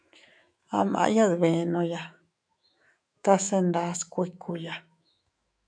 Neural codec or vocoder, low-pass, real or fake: autoencoder, 48 kHz, 128 numbers a frame, DAC-VAE, trained on Japanese speech; 9.9 kHz; fake